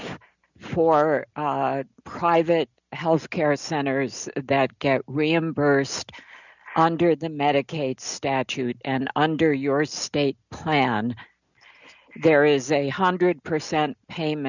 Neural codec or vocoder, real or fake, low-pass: none; real; 7.2 kHz